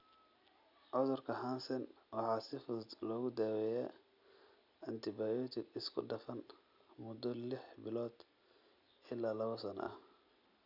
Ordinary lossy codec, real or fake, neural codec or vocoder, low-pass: none; real; none; 5.4 kHz